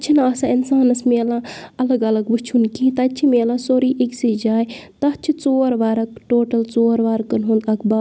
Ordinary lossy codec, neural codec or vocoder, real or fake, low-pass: none; none; real; none